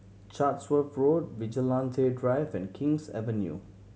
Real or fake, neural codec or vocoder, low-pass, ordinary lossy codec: real; none; none; none